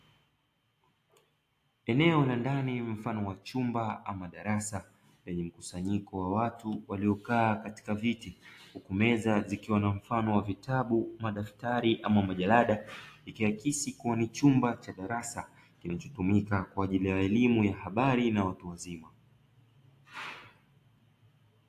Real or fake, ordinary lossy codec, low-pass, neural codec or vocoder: real; AAC, 64 kbps; 14.4 kHz; none